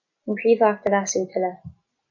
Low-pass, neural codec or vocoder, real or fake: 7.2 kHz; none; real